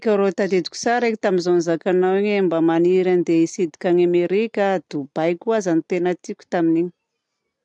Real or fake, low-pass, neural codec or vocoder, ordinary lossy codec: real; 10.8 kHz; none; none